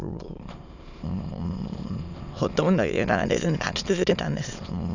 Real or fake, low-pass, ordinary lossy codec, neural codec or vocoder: fake; 7.2 kHz; none; autoencoder, 22.05 kHz, a latent of 192 numbers a frame, VITS, trained on many speakers